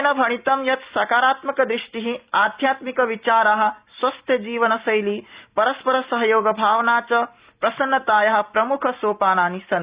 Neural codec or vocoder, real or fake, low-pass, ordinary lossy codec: none; real; 3.6 kHz; Opus, 64 kbps